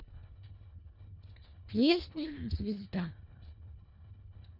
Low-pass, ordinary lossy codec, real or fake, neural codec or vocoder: 5.4 kHz; none; fake; codec, 24 kHz, 1.5 kbps, HILCodec